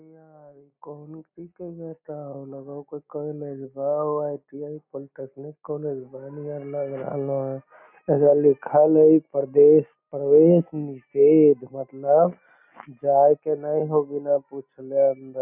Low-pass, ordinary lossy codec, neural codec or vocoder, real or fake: 3.6 kHz; none; none; real